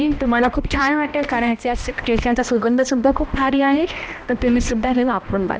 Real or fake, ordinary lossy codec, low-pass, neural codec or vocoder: fake; none; none; codec, 16 kHz, 1 kbps, X-Codec, HuBERT features, trained on balanced general audio